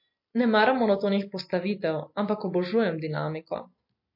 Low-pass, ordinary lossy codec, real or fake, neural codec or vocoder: 5.4 kHz; MP3, 32 kbps; real; none